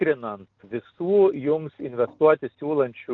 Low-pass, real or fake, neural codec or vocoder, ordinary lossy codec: 7.2 kHz; real; none; Opus, 24 kbps